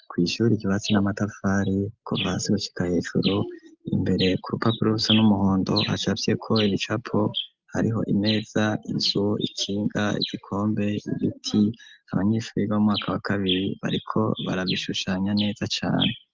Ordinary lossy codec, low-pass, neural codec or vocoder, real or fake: Opus, 32 kbps; 7.2 kHz; none; real